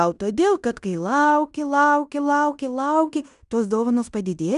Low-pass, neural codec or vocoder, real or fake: 10.8 kHz; codec, 16 kHz in and 24 kHz out, 0.9 kbps, LongCat-Audio-Codec, fine tuned four codebook decoder; fake